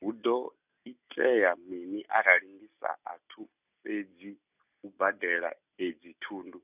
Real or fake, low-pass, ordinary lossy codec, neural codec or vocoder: real; 3.6 kHz; none; none